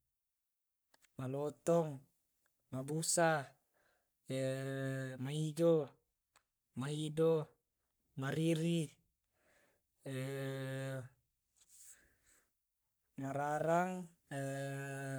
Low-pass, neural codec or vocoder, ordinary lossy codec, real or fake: none; codec, 44.1 kHz, 3.4 kbps, Pupu-Codec; none; fake